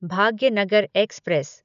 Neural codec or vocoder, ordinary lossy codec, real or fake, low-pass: none; none; real; 7.2 kHz